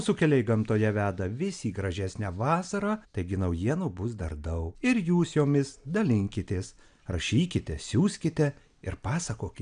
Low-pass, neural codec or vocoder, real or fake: 9.9 kHz; none; real